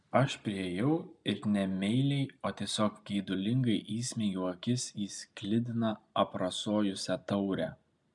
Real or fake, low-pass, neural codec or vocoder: real; 10.8 kHz; none